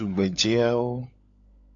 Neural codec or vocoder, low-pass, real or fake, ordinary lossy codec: codec, 16 kHz, 16 kbps, FunCodec, trained on LibriTTS, 50 frames a second; 7.2 kHz; fake; MP3, 64 kbps